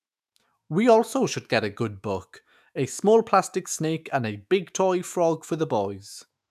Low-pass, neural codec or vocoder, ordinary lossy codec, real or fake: 14.4 kHz; autoencoder, 48 kHz, 128 numbers a frame, DAC-VAE, trained on Japanese speech; none; fake